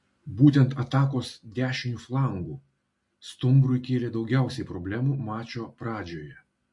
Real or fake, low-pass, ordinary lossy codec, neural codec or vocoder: real; 10.8 kHz; MP3, 48 kbps; none